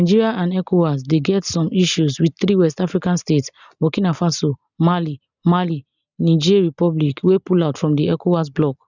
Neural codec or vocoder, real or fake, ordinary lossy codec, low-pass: none; real; none; 7.2 kHz